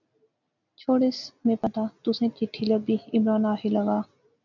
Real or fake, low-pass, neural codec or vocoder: real; 7.2 kHz; none